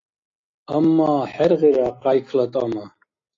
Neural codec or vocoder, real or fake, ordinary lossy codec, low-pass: none; real; AAC, 32 kbps; 7.2 kHz